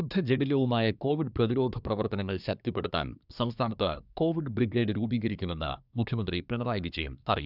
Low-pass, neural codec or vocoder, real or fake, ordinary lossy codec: 5.4 kHz; codec, 24 kHz, 1 kbps, SNAC; fake; none